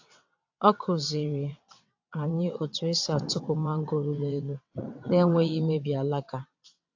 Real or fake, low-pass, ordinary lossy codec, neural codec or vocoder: fake; 7.2 kHz; AAC, 48 kbps; vocoder, 22.05 kHz, 80 mel bands, WaveNeXt